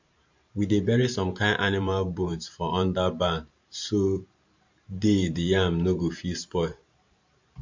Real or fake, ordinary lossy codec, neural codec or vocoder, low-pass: fake; MP3, 48 kbps; vocoder, 44.1 kHz, 128 mel bands every 512 samples, BigVGAN v2; 7.2 kHz